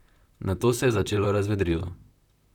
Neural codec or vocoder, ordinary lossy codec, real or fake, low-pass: vocoder, 44.1 kHz, 128 mel bands, Pupu-Vocoder; none; fake; 19.8 kHz